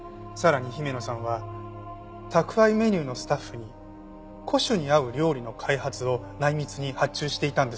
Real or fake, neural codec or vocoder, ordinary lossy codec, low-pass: real; none; none; none